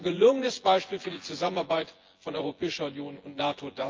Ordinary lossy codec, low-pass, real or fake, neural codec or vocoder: Opus, 32 kbps; 7.2 kHz; fake; vocoder, 24 kHz, 100 mel bands, Vocos